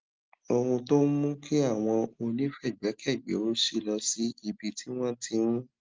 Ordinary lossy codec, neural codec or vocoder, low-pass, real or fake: Opus, 32 kbps; none; 7.2 kHz; real